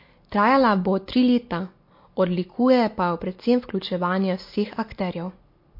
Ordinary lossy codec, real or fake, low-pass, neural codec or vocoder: MP3, 32 kbps; real; 5.4 kHz; none